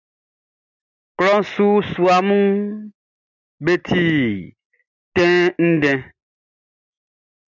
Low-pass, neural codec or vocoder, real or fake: 7.2 kHz; none; real